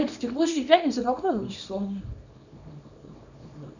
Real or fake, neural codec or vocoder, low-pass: fake; codec, 24 kHz, 0.9 kbps, WavTokenizer, small release; 7.2 kHz